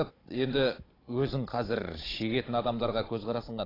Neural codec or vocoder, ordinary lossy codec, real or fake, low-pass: codec, 16 kHz, 16 kbps, FunCodec, trained on Chinese and English, 50 frames a second; AAC, 24 kbps; fake; 5.4 kHz